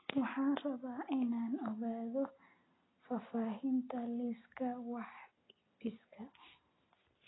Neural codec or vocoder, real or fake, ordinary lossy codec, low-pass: none; real; AAC, 16 kbps; 7.2 kHz